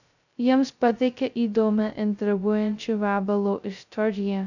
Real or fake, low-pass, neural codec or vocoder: fake; 7.2 kHz; codec, 16 kHz, 0.2 kbps, FocalCodec